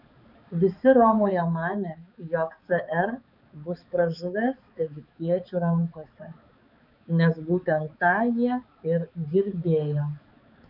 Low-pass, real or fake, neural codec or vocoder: 5.4 kHz; fake; codec, 16 kHz, 4 kbps, X-Codec, HuBERT features, trained on balanced general audio